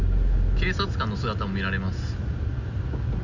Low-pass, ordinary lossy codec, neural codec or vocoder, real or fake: 7.2 kHz; none; none; real